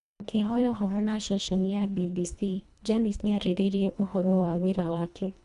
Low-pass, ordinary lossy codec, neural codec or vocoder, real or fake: 10.8 kHz; none; codec, 24 kHz, 1.5 kbps, HILCodec; fake